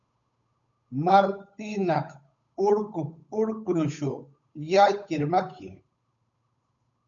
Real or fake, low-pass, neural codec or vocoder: fake; 7.2 kHz; codec, 16 kHz, 8 kbps, FunCodec, trained on Chinese and English, 25 frames a second